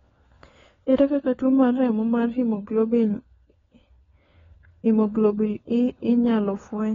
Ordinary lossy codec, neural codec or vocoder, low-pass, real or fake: AAC, 24 kbps; codec, 16 kHz, 4 kbps, FunCodec, trained on LibriTTS, 50 frames a second; 7.2 kHz; fake